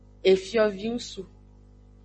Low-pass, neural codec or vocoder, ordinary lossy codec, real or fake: 9.9 kHz; none; MP3, 32 kbps; real